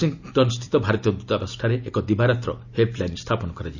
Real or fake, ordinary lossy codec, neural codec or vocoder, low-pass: real; none; none; 7.2 kHz